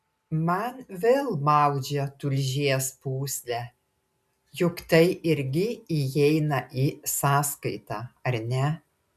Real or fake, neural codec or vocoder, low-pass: real; none; 14.4 kHz